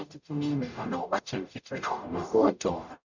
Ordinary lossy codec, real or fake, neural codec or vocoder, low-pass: none; fake; codec, 44.1 kHz, 0.9 kbps, DAC; 7.2 kHz